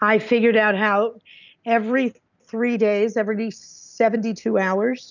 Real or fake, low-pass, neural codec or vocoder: real; 7.2 kHz; none